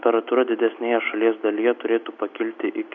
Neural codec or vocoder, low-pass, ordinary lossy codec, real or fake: none; 7.2 kHz; MP3, 64 kbps; real